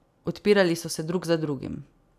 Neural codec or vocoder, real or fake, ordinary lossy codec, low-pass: none; real; none; 14.4 kHz